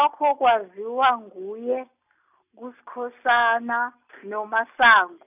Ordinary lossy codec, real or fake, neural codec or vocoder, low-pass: none; real; none; 3.6 kHz